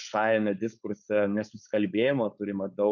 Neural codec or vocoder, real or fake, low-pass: codec, 16 kHz, 4.8 kbps, FACodec; fake; 7.2 kHz